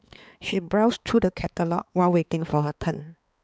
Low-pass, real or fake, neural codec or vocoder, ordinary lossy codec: none; fake; codec, 16 kHz, 4 kbps, X-Codec, HuBERT features, trained on balanced general audio; none